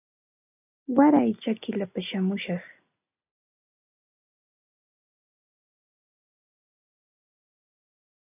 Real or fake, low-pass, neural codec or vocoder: real; 3.6 kHz; none